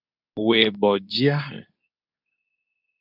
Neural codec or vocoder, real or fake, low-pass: codec, 24 kHz, 0.9 kbps, WavTokenizer, medium speech release version 2; fake; 5.4 kHz